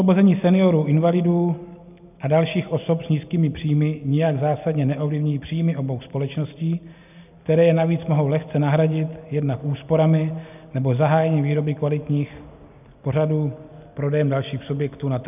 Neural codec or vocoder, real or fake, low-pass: none; real; 3.6 kHz